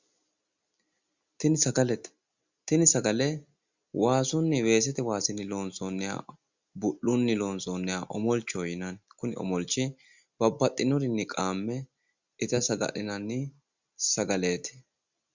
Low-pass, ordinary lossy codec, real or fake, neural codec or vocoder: 7.2 kHz; Opus, 64 kbps; real; none